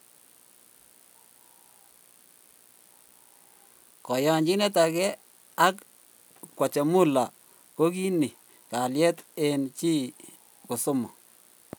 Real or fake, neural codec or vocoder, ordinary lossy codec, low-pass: real; none; none; none